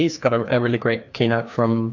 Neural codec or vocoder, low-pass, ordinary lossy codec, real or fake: codec, 16 kHz, 2 kbps, FreqCodec, larger model; 7.2 kHz; AAC, 48 kbps; fake